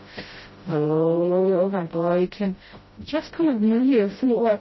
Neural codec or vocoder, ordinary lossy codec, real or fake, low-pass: codec, 16 kHz, 0.5 kbps, FreqCodec, smaller model; MP3, 24 kbps; fake; 7.2 kHz